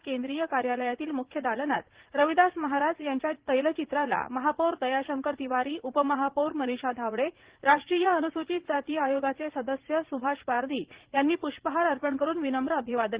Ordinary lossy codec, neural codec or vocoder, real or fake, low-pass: Opus, 16 kbps; vocoder, 44.1 kHz, 80 mel bands, Vocos; fake; 3.6 kHz